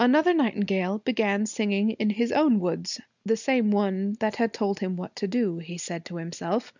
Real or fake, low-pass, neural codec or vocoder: real; 7.2 kHz; none